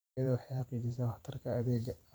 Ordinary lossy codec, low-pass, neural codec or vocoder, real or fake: none; none; vocoder, 44.1 kHz, 128 mel bands every 512 samples, BigVGAN v2; fake